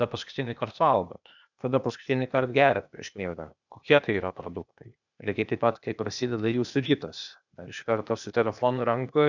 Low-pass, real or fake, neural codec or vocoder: 7.2 kHz; fake; codec, 16 kHz, 0.8 kbps, ZipCodec